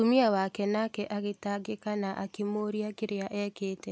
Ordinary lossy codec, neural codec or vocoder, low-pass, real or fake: none; none; none; real